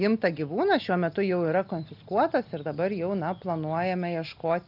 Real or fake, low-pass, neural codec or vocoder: real; 5.4 kHz; none